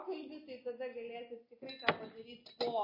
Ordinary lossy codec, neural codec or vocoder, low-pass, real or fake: MP3, 24 kbps; none; 5.4 kHz; real